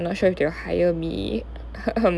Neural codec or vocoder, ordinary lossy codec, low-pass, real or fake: none; none; none; real